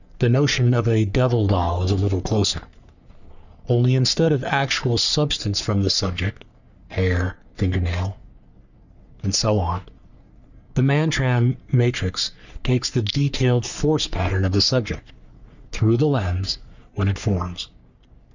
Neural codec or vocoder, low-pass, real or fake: codec, 44.1 kHz, 3.4 kbps, Pupu-Codec; 7.2 kHz; fake